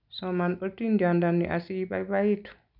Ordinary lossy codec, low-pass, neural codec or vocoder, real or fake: none; 5.4 kHz; none; real